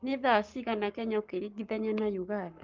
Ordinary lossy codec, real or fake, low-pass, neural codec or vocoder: Opus, 24 kbps; fake; 7.2 kHz; codec, 44.1 kHz, 7.8 kbps, Pupu-Codec